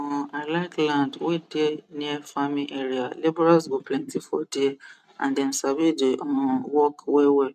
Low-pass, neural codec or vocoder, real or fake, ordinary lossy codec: 14.4 kHz; none; real; none